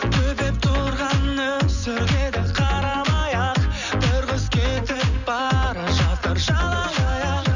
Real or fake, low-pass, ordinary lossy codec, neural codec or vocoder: real; 7.2 kHz; none; none